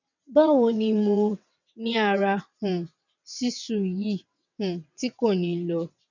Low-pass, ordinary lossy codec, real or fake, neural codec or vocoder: 7.2 kHz; none; fake; vocoder, 22.05 kHz, 80 mel bands, WaveNeXt